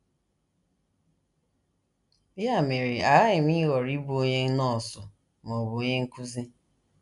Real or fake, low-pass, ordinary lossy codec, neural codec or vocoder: real; 10.8 kHz; none; none